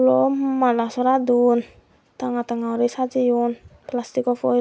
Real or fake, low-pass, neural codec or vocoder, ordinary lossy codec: real; none; none; none